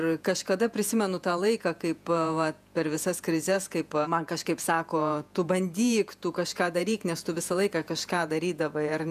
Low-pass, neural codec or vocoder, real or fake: 14.4 kHz; vocoder, 48 kHz, 128 mel bands, Vocos; fake